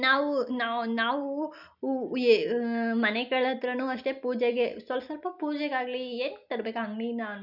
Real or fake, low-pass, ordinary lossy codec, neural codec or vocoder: real; 5.4 kHz; none; none